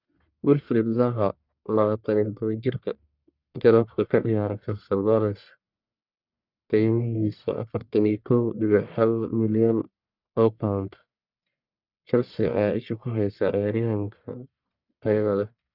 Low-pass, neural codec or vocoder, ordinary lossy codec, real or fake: 5.4 kHz; codec, 44.1 kHz, 1.7 kbps, Pupu-Codec; none; fake